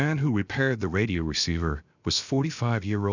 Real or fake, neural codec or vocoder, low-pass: fake; codec, 16 kHz, 0.7 kbps, FocalCodec; 7.2 kHz